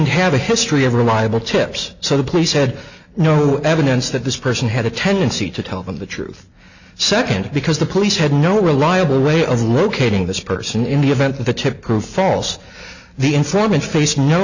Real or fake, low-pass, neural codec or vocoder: real; 7.2 kHz; none